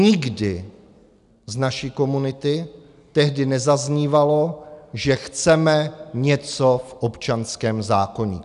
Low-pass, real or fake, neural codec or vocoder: 10.8 kHz; real; none